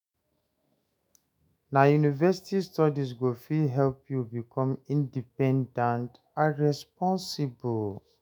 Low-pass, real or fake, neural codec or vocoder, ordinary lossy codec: none; fake; autoencoder, 48 kHz, 128 numbers a frame, DAC-VAE, trained on Japanese speech; none